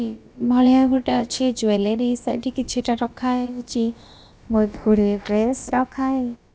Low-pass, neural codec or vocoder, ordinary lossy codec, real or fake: none; codec, 16 kHz, about 1 kbps, DyCAST, with the encoder's durations; none; fake